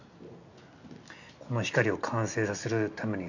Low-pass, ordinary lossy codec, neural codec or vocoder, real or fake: 7.2 kHz; none; none; real